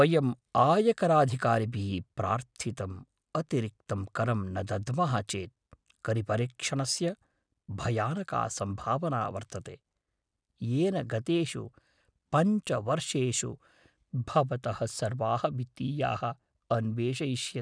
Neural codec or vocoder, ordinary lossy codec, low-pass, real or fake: none; none; 9.9 kHz; real